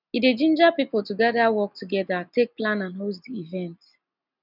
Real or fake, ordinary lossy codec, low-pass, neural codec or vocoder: real; none; 5.4 kHz; none